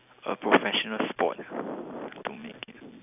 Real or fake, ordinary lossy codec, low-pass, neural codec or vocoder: real; none; 3.6 kHz; none